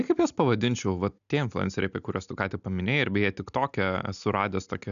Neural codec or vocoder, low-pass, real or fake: none; 7.2 kHz; real